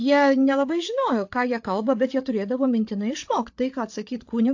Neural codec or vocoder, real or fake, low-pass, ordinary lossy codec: codec, 16 kHz, 8 kbps, FreqCodec, larger model; fake; 7.2 kHz; AAC, 48 kbps